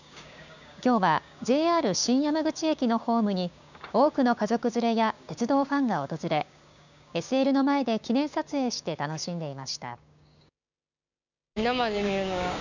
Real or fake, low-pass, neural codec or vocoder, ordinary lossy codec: fake; 7.2 kHz; codec, 16 kHz, 6 kbps, DAC; none